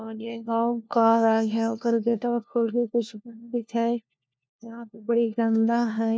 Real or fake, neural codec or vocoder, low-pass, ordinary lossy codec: fake; codec, 16 kHz, 1 kbps, FunCodec, trained on LibriTTS, 50 frames a second; none; none